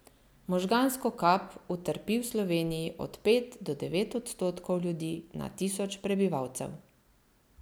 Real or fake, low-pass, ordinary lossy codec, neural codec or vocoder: real; none; none; none